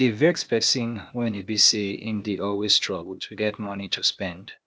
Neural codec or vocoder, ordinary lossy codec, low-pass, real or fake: codec, 16 kHz, 0.8 kbps, ZipCodec; none; none; fake